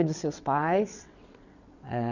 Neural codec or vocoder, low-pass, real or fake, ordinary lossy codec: vocoder, 22.05 kHz, 80 mel bands, WaveNeXt; 7.2 kHz; fake; none